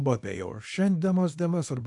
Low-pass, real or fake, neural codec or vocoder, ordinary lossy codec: 10.8 kHz; fake; codec, 24 kHz, 0.9 kbps, WavTokenizer, small release; AAC, 64 kbps